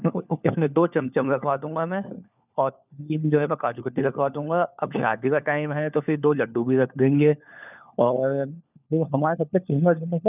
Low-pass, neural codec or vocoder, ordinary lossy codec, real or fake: 3.6 kHz; codec, 16 kHz, 4 kbps, FunCodec, trained on LibriTTS, 50 frames a second; none; fake